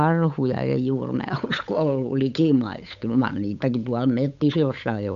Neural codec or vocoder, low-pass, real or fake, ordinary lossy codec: codec, 16 kHz, 8 kbps, FunCodec, trained on Chinese and English, 25 frames a second; 7.2 kHz; fake; none